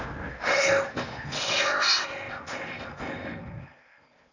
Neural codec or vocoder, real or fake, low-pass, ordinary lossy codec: codec, 16 kHz in and 24 kHz out, 0.8 kbps, FocalCodec, streaming, 65536 codes; fake; 7.2 kHz; none